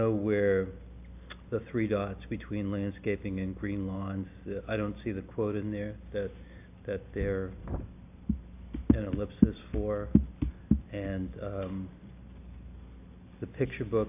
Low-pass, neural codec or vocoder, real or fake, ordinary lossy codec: 3.6 kHz; none; real; AAC, 32 kbps